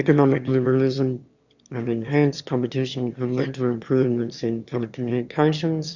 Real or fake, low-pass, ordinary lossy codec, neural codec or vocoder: fake; 7.2 kHz; Opus, 64 kbps; autoencoder, 22.05 kHz, a latent of 192 numbers a frame, VITS, trained on one speaker